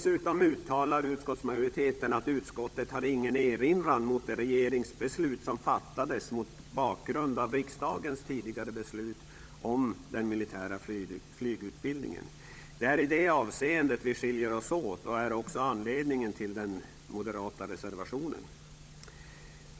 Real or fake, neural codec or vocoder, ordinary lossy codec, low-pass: fake; codec, 16 kHz, 16 kbps, FunCodec, trained on LibriTTS, 50 frames a second; none; none